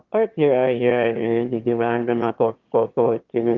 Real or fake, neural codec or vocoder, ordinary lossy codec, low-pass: fake; autoencoder, 22.05 kHz, a latent of 192 numbers a frame, VITS, trained on one speaker; Opus, 32 kbps; 7.2 kHz